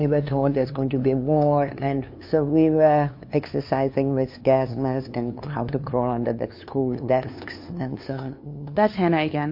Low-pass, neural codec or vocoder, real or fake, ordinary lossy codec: 5.4 kHz; codec, 16 kHz, 2 kbps, FunCodec, trained on LibriTTS, 25 frames a second; fake; MP3, 32 kbps